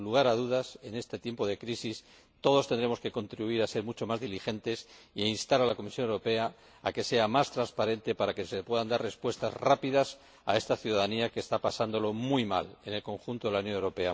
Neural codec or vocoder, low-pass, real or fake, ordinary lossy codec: none; none; real; none